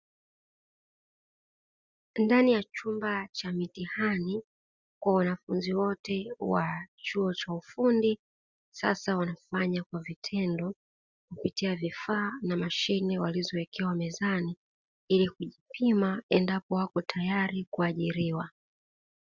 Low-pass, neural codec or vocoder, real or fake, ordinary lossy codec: 7.2 kHz; none; real; Opus, 64 kbps